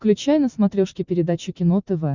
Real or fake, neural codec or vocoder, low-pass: real; none; 7.2 kHz